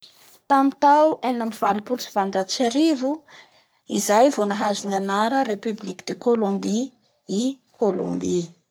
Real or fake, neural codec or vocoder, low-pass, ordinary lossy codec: fake; codec, 44.1 kHz, 3.4 kbps, Pupu-Codec; none; none